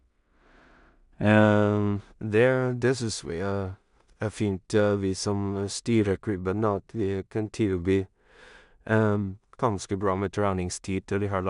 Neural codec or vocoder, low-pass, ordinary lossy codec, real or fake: codec, 16 kHz in and 24 kHz out, 0.4 kbps, LongCat-Audio-Codec, two codebook decoder; 10.8 kHz; none; fake